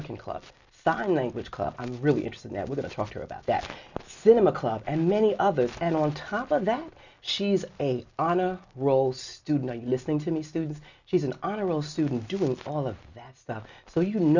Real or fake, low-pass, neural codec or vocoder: real; 7.2 kHz; none